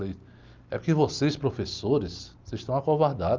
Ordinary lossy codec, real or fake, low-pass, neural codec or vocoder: Opus, 24 kbps; real; 7.2 kHz; none